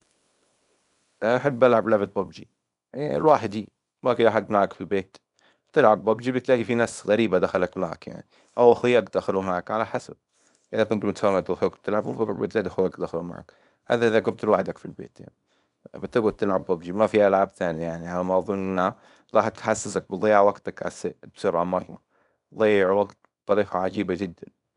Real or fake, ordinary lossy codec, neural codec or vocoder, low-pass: fake; none; codec, 24 kHz, 0.9 kbps, WavTokenizer, small release; 10.8 kHz